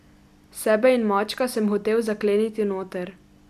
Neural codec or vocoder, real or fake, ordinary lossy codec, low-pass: none; real; none; 14.4 kHz